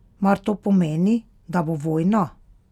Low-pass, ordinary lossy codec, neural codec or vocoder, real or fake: 19.8 kHz; none; none; real